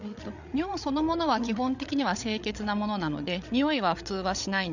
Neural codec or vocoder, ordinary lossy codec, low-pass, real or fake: codec, 16 kHz, 8 kbps, FreqCodec, larger model; none; 7.2 kHz; fake